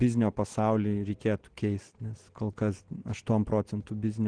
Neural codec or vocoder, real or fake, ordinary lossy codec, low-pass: none; real; Opus, 16 kbps; 9.9 kHz